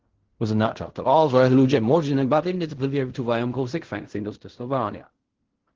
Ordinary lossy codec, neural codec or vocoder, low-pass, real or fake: Opus, 16 kbps; codec, 16 kHz in and 24 kHz out, 0.4 kbps, LongCat-Audio-Codec, fine tuned four codebook decoder; 7.2 kHz; fake